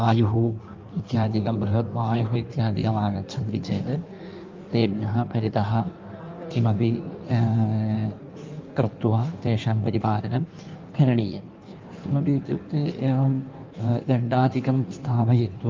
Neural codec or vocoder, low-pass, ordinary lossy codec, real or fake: codec, 16 kHz in and 24 kHz out, 1.1 kbps, FireRedTTS-2 codec; 7.2 kHz; Opus, 24 kbps; fake